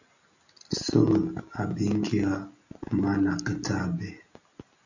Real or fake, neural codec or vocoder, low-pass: real; none; 7.2 kHz